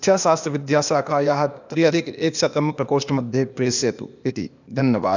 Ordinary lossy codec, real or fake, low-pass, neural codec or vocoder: none; fake; 7.2 kHz; codec, 16 kHz, 0.8 kbps, ZipCodec